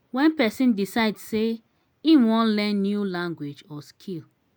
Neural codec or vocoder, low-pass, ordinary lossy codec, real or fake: none; none; none; real